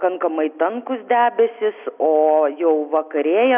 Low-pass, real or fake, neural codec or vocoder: 3.6 kHz; real; none